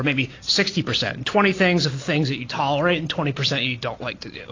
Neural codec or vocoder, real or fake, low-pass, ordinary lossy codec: none; real; 7.2 kHz; AAC, 32 kbps